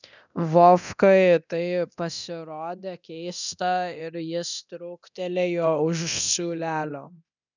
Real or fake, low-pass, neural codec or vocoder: fake; 7.2 kHz; codec, 24 kHz, 0.9 kbps, DualCodec